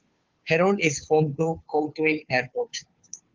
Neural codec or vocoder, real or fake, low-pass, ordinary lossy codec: codec, 16 kHz, 2 kbps, FunCodec, trained on Chinese and English, 25 frames a second; fake; 7.2 kHz; Opus, 32 kbps